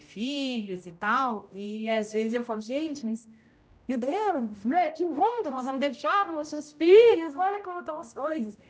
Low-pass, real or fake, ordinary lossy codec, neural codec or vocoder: none; fake; none; codec, 16 kHz, 0.5 kbps, X-Codec, HuBERT features, trained on general audio